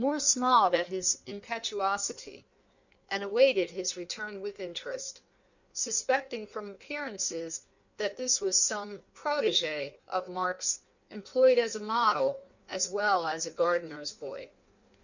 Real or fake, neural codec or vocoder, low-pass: fake; codec, 16 kHz in and 24 kHz out, 1.1 kbps, FireRedTTS-2 codec; 7.2 kHz